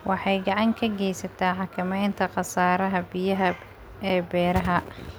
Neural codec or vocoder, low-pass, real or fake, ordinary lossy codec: none; none; real; none